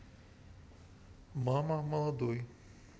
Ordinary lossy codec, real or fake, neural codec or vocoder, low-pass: none; real; none; none